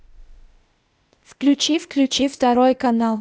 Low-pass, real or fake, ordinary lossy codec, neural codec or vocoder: none; fake; none; codec, 16 kHz, 0.8 kbps, ZipCodec